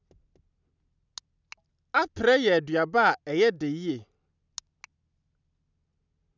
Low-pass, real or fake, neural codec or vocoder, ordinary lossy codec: 7.2 kHz; real; none; none